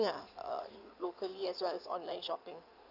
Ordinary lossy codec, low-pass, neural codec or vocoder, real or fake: none; 5.4 kHz; codec, 16 kHz in and 24 kHz out, 2.2 kbps, FireRedTTS-2 codec; fake